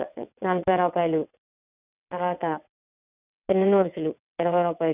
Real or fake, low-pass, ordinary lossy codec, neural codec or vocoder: fake; 3.6 kHz; none; vocoder, 22.05 kHz, 80 mel bands, WaveNeXt